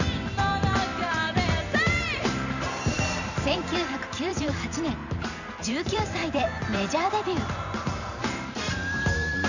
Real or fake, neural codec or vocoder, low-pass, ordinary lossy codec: fake; vocoder, 44.1 kHz, 128 mel bands every 512 samples, BigVGAN v2; 7.2 kHz; none